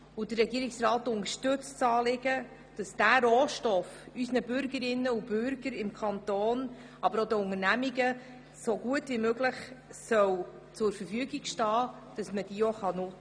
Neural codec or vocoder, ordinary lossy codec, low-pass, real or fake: none; none; 9.9 kHz; real